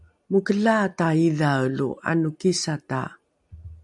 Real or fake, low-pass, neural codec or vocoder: real; 10.8 kHz; none